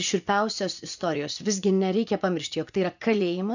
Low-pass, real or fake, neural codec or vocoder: 7.2 kHz; fake; vocoder, 24 kHz, 100 mel bands, Vocos